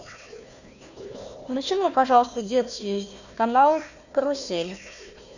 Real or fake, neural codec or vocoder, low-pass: fake; codec, 16 kHz, 1 kbps, FunCodec, trained on Chinese and English, 50 frames a second; 7.2 kHz